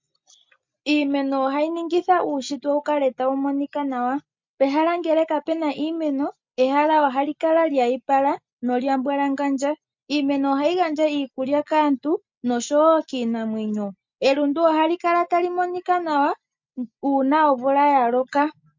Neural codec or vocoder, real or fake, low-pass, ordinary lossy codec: none; real; 7.2 kHz; MP3, 48 kbps